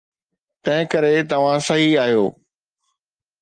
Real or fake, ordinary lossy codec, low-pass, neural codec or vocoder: real; Opus, 32 kbps; 9.9 kHz; none